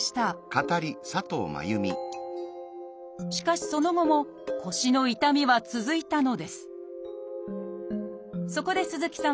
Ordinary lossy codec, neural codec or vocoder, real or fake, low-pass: none; none; real; none